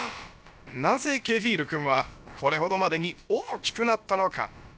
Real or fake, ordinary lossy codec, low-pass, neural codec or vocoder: fake; none; none; codec, 16 kHz, about 1 kbps, DyCAST, with the encoder's durations